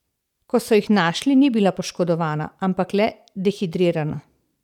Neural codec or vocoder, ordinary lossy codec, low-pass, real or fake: vocoder, 44.1 kHz, 128 mel bands every 512 samples, BigVGAN v2; none; 19.8 kHz; fake